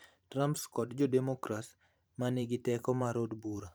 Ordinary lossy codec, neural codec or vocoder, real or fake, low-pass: none; vocoder, 44.1 kHz, 128 mel bands every 512 samples, BigVGAN v2; fake; none